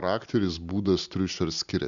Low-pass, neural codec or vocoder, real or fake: 7.2 kHz; none; real